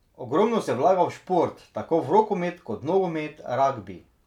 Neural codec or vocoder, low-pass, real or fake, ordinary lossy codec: none; 19.8 kHz; real; none